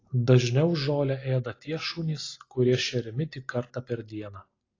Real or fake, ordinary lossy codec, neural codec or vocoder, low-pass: real; AAC, 32 kbps; none; 7.2 kHz